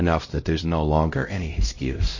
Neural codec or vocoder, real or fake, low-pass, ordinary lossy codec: codec, 16 kHz, 0.5 kbps, X-Codec, HuBERT features, trained on LibriSpeech; fake; 7.2 kHz; MP3, 32 kbps